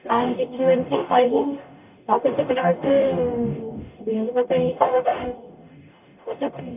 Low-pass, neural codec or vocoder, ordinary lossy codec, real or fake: 3.6 kHz; codec, 44.1 kHz, 0.9 kbps, DAC; none; fake